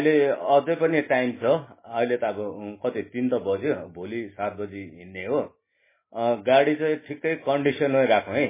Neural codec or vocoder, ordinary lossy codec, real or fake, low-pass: none; MP3, 16 kbps; real; 3.6 kHz